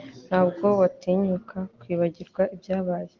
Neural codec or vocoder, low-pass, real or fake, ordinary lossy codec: none; 7.2 kHz; real; Opus, 16 kbps